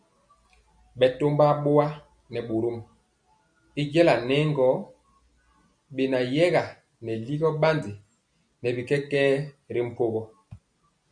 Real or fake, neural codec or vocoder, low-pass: real; none; 9.9 kHz